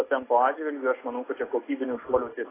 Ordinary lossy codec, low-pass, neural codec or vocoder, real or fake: AAC, 16 kbps; 3.6 kHz; none; real